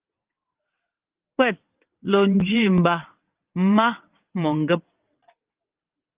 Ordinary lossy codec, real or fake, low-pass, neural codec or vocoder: Opus, 32 kbps; fake; 3.6 kHz; vocoder, 22.05 kHz, 80 mel bands, WaveNeXt